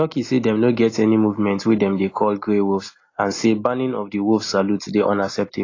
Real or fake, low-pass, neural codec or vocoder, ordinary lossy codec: real; 7.2 kHz; none; AAC, 32 kbps